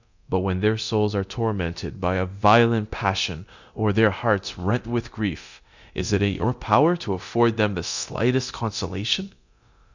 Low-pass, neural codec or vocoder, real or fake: 7.2 kHz; codec, 24 kHz, 0.9 kbps, DualCodec; fake